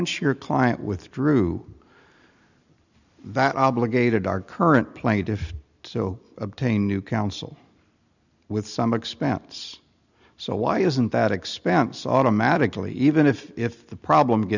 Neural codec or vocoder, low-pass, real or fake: none; 7.2 kHz; real